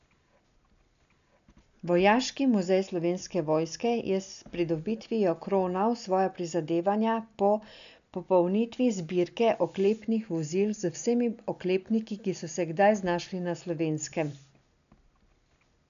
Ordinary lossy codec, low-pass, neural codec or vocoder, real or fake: none; 7.2 kHz; none; real